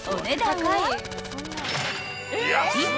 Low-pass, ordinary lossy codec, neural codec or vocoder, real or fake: none; none; none; real